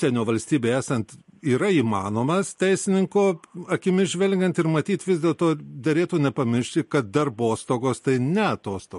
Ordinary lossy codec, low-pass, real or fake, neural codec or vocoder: MP3, 48 kbps; 14.4 kHz; real; none